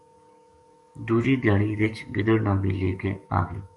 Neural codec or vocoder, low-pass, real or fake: codec, 44.1 kHz, 7.8 kbps, DAC; 10.8 kHz; fake